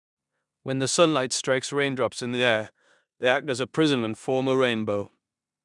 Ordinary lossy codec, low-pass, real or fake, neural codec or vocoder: none; 10.8 kHz; fake; codec, 16 kHz in and 24 kHz out, 0.9 kbps, LongCat-Audio-Codec, four codebook decoder